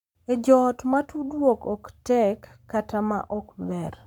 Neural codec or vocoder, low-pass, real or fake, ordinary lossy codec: codec, 44.1 kHz, 7.8 kbps, Pupu-Codec; 19.8 kHz; fake; none